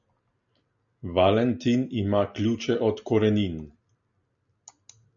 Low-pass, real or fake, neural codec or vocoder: 7.2 kHz; real; none